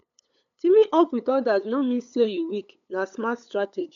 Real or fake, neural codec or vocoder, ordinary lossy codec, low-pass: fake; codec, 16 kHz, 8 kbps, FunCodec, trained on LibriTTS, 25 frames a second; none; 7.2 kHz